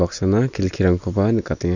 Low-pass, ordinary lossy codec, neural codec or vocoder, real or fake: 7.2 kHz; none; none; real